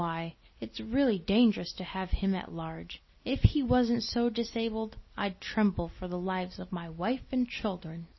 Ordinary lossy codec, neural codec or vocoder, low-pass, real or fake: MP3, 24 kbps; none; 7.2 kHz; real